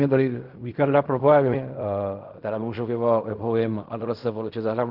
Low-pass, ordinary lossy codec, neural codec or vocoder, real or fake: 5.4 kHz; Opus, 32 kbps; codec, 16 kHz in and 24 kHz out, 0.4 kbps, LongCat-Audio-Codec, fine tuned four codebook decoder; fake